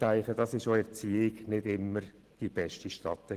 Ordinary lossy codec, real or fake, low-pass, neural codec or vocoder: Opus, 16 kbps; real; 14.4 kHz; none